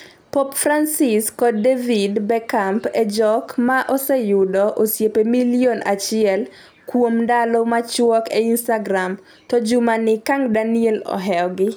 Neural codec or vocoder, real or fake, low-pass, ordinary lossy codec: none; real; none; none